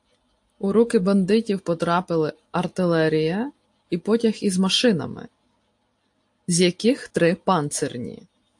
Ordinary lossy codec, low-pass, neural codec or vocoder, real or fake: AAC, 64 kbps; 10.8 kHz; none; real